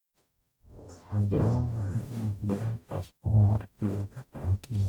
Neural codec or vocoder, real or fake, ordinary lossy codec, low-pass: codec, 44.1 kHz, 0.9 kbps, DAC; fake; none; 19.8 kHz